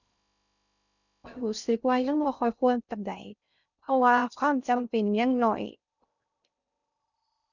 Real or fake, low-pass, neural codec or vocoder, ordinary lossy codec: fake; 7.2 kHz; codec, 16 kHz in and 24 kHz out, 0.6 kbps, FocalCodec, streaming, 2048 codes; none